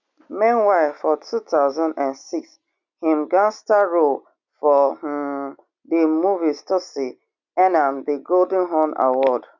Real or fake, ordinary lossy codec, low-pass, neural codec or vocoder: real; none; 7.2 kHz; none